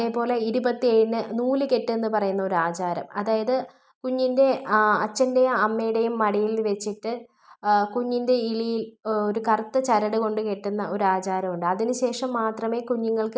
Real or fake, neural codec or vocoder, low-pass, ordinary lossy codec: real; none; none; none